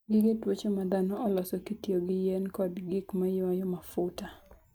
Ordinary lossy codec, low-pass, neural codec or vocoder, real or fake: none; none; none; real